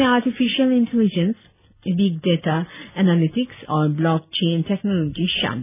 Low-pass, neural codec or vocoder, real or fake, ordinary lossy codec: 3.6 kHz; none; real; none